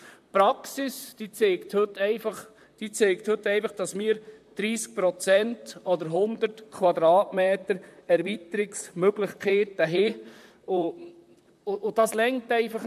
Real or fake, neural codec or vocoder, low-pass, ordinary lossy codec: fake; vocoder, 44.1 kHz, 128 mel bands, Pupu-Vocoder; 14.4 kHz; MP3, 96 kbps